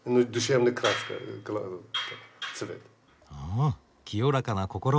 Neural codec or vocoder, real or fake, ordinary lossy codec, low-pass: none; real; none; none